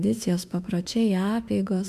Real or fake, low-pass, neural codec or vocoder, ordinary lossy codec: fake; 14.4 kHz; autoencoder, 48 kHz, 32 numbers a frame, DAC-VAE, trained on Japanese speech; MP3, 96 kbps